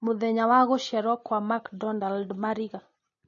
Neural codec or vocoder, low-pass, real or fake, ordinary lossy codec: none; 7.2 kHz; real; MP3, 32 kbps